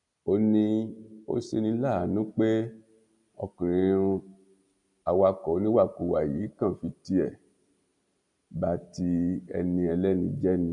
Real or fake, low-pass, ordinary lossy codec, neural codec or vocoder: real; 10.8 kHz; MP3, 64 kbps; none